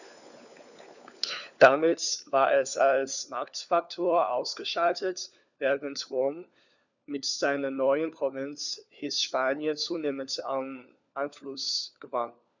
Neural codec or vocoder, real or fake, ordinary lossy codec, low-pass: codec, 16 kHz, 4 kbps, FunCodec, trained on LibriTTS, 50 frames a second; fake; none; 7.2 kHz